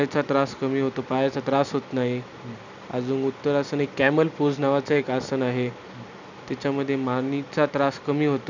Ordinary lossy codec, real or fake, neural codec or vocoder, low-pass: none; real; none; 7.2 kHz